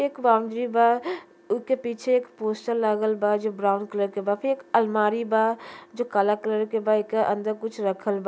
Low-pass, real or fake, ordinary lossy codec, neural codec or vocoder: none; real; none; none